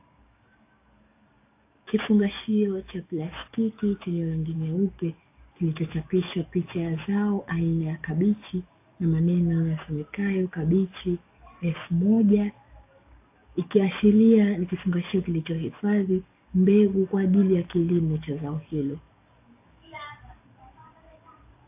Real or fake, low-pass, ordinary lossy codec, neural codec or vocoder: fake; 3.6 kHz; AAC, 24 kbps; codec, 44.1 kHz, 7.8 kbps, DAC